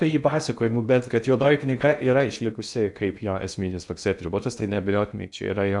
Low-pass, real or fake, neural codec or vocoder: 10.8 kHz; fake; codec, 16 kHz in and 24 kHz out, 0.6 kbps, FocalCodec, streaming, 2048 codes